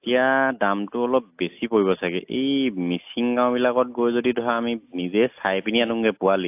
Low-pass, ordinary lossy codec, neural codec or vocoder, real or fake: 3.6 kHz; AAC, 32 kbps; none; real